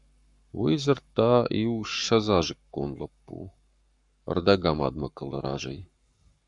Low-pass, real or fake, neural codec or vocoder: 10.8 kHz; fake; codec, 44.1 kHz, 7.8 kbps, Pupu-Codec